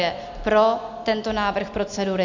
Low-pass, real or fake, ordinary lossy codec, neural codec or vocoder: 7.2 kHz; real; MP3, 64 kbps; none